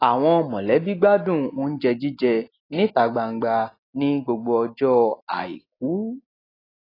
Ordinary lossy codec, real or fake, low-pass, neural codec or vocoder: AAC, 24 kbps; real; 5.4 kHz; none